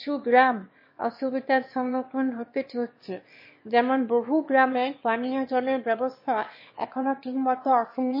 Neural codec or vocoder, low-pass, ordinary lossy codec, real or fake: autoencoder, 22.05 kHz, a latent of 192 numbers a frame, VITS, trained on one speaker; 5.4 kHz; MP3, 24 kbps; fake